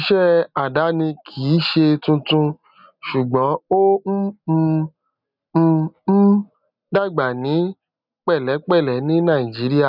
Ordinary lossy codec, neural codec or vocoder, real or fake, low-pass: none; none; real; 5.4 kHz